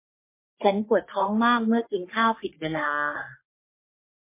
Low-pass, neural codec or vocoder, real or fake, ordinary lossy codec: 3.6 kHz; codec, 44.1 kHz, 3.4 kbps, Pupu-Codec; fake; MP3, 24 kbps